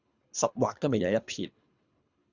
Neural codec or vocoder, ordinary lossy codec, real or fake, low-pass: codec, 24 kHz, 3 kbps, HILCodec; Opus, 64 kbps; fake; 7.2 kHz